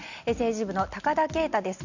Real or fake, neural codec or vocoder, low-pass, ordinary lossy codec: real; none; 7.2 kHz; none